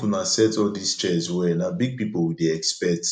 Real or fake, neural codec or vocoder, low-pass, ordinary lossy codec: real; none; 9.9 kHz; none